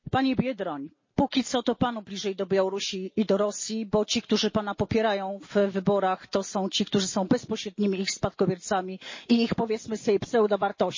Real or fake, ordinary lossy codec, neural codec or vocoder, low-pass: real; MP3, 32 kbps; none; 7.2 kHz